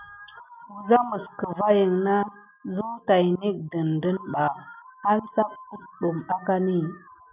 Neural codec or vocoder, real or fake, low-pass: none; real; 3.6 kHz